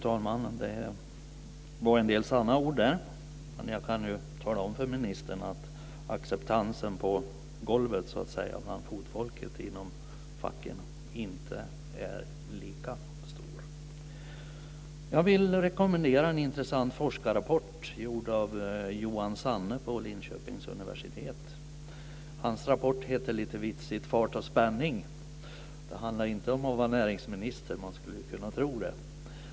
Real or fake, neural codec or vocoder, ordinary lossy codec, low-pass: real; none; none; none